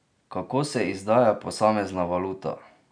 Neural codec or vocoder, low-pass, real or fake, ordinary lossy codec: none; 9.9 kHz; real; none